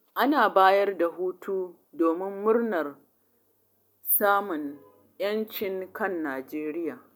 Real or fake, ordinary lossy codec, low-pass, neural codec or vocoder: real; none; none; none